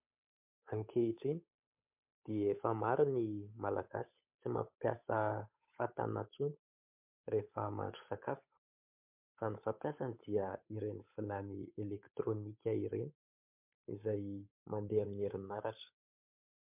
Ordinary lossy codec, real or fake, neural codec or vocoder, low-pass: MP3, 32 kbps; fake; codec, 16 kHz, 8 kbps, FunCodec, trained on Chinese and English, 25 frames a second; 3.6 kHz